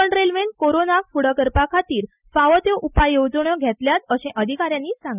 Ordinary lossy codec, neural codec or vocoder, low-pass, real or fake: none; none; 3.6 kHz; real